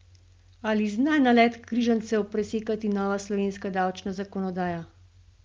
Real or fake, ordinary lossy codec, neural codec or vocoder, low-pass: real; Opus, 32 kbps; none; 7.2 kHz